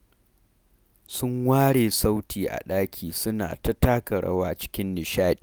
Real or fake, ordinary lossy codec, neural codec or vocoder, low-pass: real; none; none; none